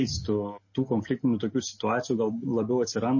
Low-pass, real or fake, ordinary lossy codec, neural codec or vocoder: 7.2 kHz; real; MP3, 32 kbps; none